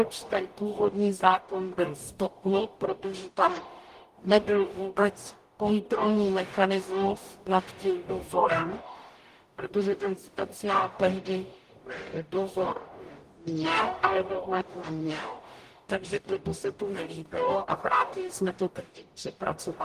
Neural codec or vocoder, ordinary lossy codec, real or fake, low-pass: codec, 44.1 kHz, 0.9 kbps, DAC; Opus, 24 kbps; fake; 14.4 kHz